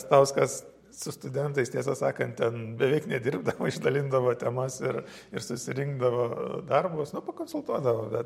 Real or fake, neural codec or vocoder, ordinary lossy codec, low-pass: real; none; MP3, 64 kbps; 19.8 kHz